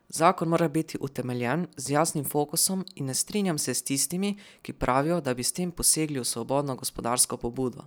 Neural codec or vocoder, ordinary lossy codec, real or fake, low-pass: none; none; real; none